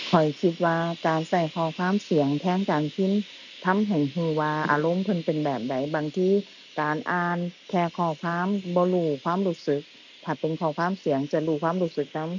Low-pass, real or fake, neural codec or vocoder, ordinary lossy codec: 7.2 kHz; real; none; none